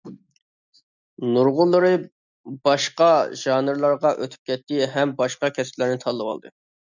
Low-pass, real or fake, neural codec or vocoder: 7.2 kHz; real; none